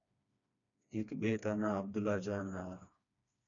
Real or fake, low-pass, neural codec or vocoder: fake; 7.2 kHz; codec, 16 kHz, 2 kbps, FreqCodec, smaller model